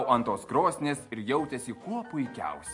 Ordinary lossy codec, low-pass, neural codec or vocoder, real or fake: MP3, 48 kbps; 10.8 kHz; none; real